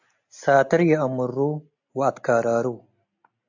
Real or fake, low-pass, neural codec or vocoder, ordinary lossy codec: real; 7.2 kHz; none; AAC, 48 kbps